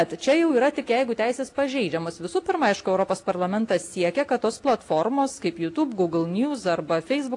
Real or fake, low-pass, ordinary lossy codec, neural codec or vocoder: real; 10.8 kHz; AAC, 48 kbps; none